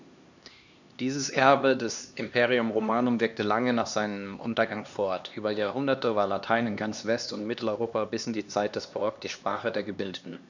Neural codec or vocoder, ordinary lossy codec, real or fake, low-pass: codec, 16 kHz, 2 kbps, X-Codec, HuBERT features, trained on LibriSpeech; none; fake; 7.2 kHz